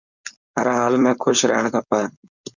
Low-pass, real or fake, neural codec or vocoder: 7.2 kHz; fake; codec, 16 kHz, 4.8 kbps, FACodec